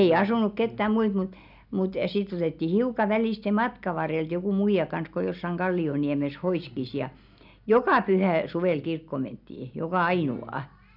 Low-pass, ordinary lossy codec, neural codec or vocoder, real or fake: 5.4 kHz; none; none; real